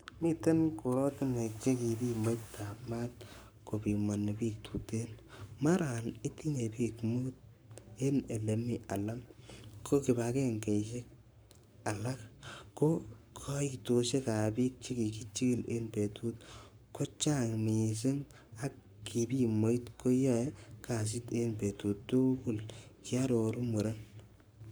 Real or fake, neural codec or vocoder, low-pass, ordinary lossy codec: fake; codec, 44.1 kHz, 7.8 kbps, Pupu-Codec; none; none